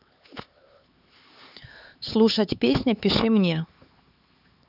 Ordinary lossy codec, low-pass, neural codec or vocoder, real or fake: none; 5.4 kHz; codec, 16 kHz, 4 kbps, X-Codec, HuBERT features, trained on LibriSpeech; fake